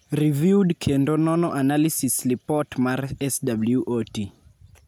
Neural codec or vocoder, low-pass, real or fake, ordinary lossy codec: none; none; real; none